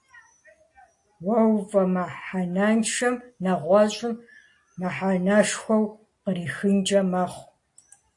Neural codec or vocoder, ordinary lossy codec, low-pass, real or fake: none; AAC, 48 kbps; 10.8 kHz; real